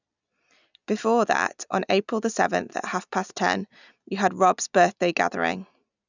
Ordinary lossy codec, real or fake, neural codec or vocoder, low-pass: none; real; none; 7.2 kHz